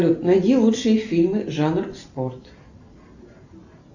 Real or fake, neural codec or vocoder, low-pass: real; none; 7.2 kHz